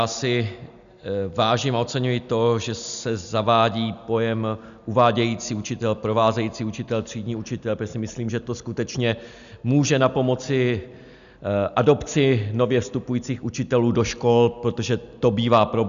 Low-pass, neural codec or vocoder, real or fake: 7.2 kHz; none; real